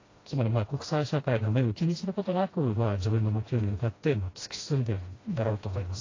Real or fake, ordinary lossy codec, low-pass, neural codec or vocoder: fake; AAC, 32 kbps; 7.2 kHz; codec, 16 kHz, 1 kbps, FreqCodec, smaller model